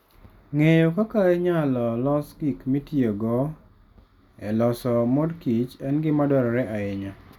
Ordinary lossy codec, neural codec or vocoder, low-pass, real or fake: none; none; 19.8 kHz; real